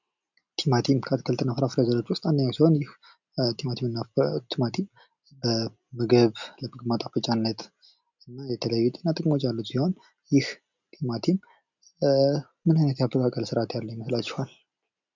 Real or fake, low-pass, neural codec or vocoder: real; 7.2 kHz; none